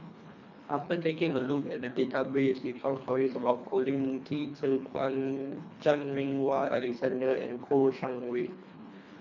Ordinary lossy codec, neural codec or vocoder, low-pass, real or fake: none; codec, 24 kHz, 1.5 kbps, HILCodec; 7.2 kHz; fake